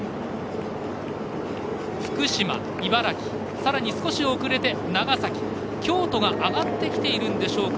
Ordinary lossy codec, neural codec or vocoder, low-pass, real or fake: none; none; none; real